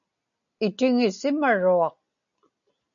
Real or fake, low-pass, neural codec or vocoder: real; 7.2 kHz; none